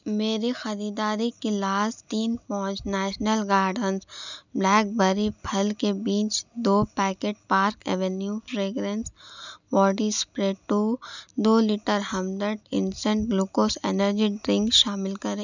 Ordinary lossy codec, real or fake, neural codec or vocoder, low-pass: none; real; none; 7.2 kHz